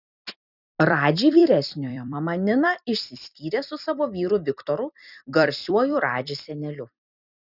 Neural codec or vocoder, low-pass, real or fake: vocoder, 44.1 kHz, 128 mel bands every 256 samples, BigVGAN v2; 5.4 kHz; fake